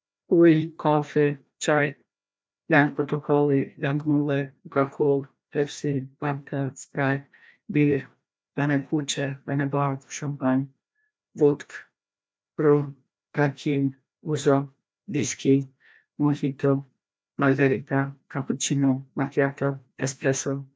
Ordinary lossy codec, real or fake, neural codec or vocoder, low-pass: none; fake; codec, 16 kHz, 1 kbps, FreqCodec, larger model; none